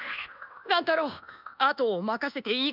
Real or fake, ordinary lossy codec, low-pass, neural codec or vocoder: fake; none; 5.4 kHz; codec, 24 kHz, 1.2 kbps, DualCodec